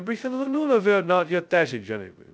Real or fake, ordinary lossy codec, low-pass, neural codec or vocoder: fake; none; none; codec, 16 kHz, 0.2 kbps, FocalCodec